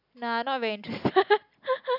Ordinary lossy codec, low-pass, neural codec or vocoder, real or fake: none; 5.4 kHz; none; real